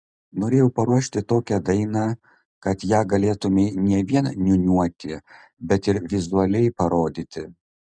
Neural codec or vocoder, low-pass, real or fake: none; 9.9 kHz; real